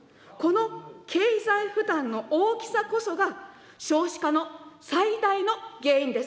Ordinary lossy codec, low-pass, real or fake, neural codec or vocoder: none; none; real; none